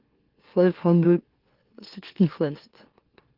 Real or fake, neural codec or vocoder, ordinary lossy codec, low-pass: fake; autoencoder, 44.1 kHz, a latent of 192 numbers a frame, MeloTTS; Opus, 16 kbps; 5.4 kHz